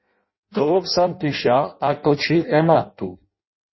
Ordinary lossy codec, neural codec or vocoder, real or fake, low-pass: MP3, 24 kbps; codec, 16 kHz in and 24 kHz out, 0.6 kbps, FireRedTTS-2 codec; fake; 7.2 kHz